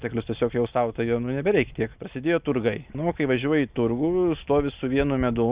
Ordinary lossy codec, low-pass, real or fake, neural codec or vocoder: Opus, 64 kbps; 3.6 kHz; real; none